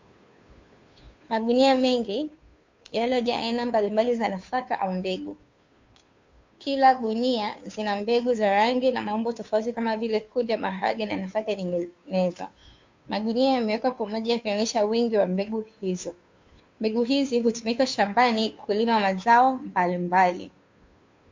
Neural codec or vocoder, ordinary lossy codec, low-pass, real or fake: codec, 16 kHz, 2 kbps, FunCodec, trained on Chinese and English, 25 frames a second; MP3, 48 kbps; 7.2 kHz; fake